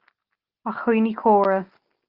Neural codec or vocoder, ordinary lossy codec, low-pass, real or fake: none; Opus, 24 kbps; 5.4 kHz; real